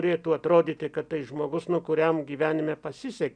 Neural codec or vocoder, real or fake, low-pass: none; real; 9.9 kHz